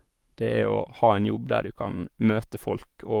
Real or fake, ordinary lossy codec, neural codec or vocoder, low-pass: fake; Opus, 32 kbps; codec, 44.1 kHz, 7.8 kbps, Pupu-Codec; 19.8 kHz